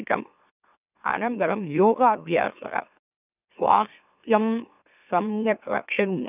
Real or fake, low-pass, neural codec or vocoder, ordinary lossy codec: fake; 3.6 kHz; autoencoder, 44.1 kHz, a latent of 192 numbers a frame, MeloTTS; none